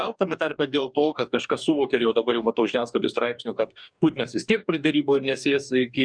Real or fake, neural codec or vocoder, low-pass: fake; codec, 44.1 kHz, 2.6 kbps, DAC; 9.9 kHz